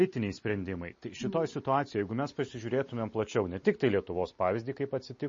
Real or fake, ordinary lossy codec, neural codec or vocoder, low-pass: real; MP3, 32 kbps; none; 7.2 kHz